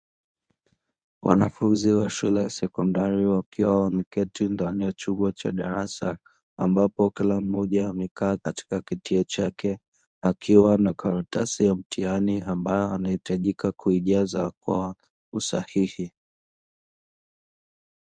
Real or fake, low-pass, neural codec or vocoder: fake; 9.9 kHz; codec, 24 kHz, 0.9 kbps, WavTokenizer, medium speech release version 1